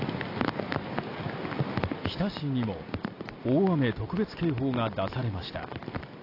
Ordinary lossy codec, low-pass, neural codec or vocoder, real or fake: AAC, 32 kbps; 5.4 kHz; none; real